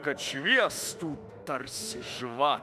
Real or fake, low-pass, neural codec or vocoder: fake; 14.4 kHz; autoencoder, 48 kHz, 32 numbers a frame, DAC-VAE, trained on Japanese speech